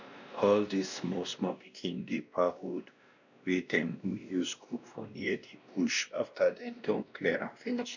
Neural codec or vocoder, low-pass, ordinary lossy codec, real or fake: codec, 16 kHz, 1 kbps, X-Codec, WavLM features, trained on Multilingual LibriSpeech; 7.2 kHz; none; fake